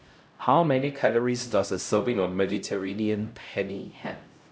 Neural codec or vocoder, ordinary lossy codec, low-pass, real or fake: codec, 16 kHz, 0.5 kbps, X-Codec, HuBERT features, trained on LibriSpeech; none; none; fake